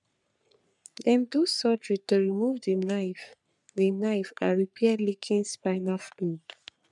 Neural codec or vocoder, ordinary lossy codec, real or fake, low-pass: codec, 44.1 kHz, 3.4 kbps, Pupu-Codec; none; fake; 10.8 kHz